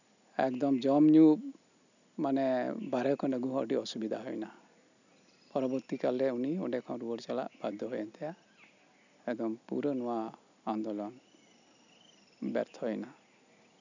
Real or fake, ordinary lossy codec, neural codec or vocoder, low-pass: real; none; none; 7.2 kHz